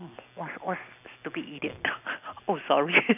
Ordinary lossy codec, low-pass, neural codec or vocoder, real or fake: none; 3.6 kHz; none; real